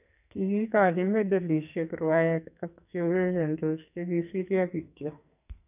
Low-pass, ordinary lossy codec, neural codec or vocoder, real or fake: 3.6 kHz; none; codec, 44.1 kHz, 2.6 kbps, SNAC; fake